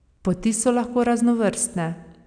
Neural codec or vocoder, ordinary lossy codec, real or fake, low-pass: none; none; real; 9.9 kHz